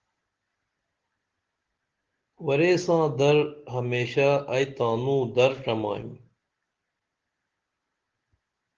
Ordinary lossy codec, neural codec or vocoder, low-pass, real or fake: Opus, 16 kbps; none; 7.2 kHz; real